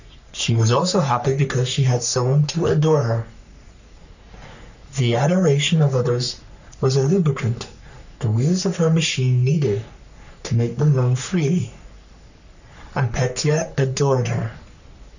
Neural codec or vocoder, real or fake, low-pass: codec, 44.1 kHz, 3.4 kbps, Pupu-Codec; fake; 7.2 kHz